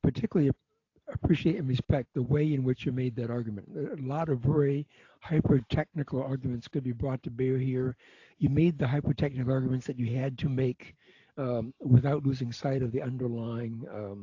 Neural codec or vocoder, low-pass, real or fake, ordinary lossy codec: none; 7.2 kHz; real; AAC, 48 kbps